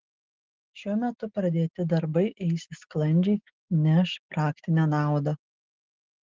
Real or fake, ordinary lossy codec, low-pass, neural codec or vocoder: real; Opus, 16 kbps; 7.2 kHz; none